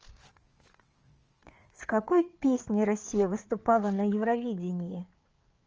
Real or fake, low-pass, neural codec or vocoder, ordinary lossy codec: fake; 7.2 kHz; codec, 16 kHz, 4 kbps, FreqCodec, larger model; Opus, 24 kbps